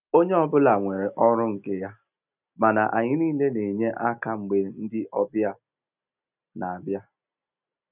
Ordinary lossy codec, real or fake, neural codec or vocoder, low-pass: none; real; none; 3.6 kHz